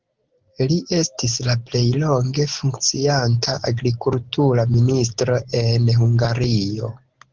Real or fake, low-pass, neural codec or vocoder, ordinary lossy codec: real; 7.2 kHz; none; Opus, 16 kbps